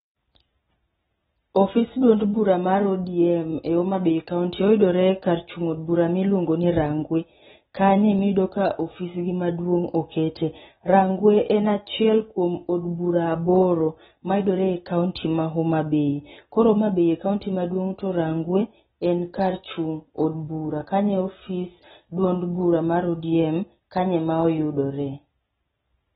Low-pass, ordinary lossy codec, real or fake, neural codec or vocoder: 7.2 kHz; AAC, 16 kbps; real; none